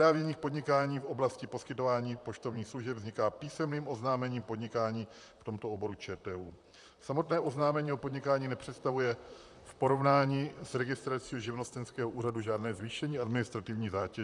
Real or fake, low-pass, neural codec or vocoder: fake; 10.8 kHz; vocoder, 44.1 kHz, 128 mel bands, Pupu-Vocoder